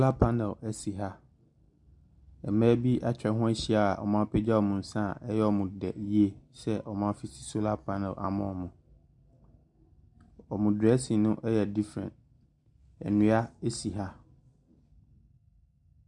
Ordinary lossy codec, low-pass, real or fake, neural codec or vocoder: MP3, 96 kbps; 10.8 kHz; real; none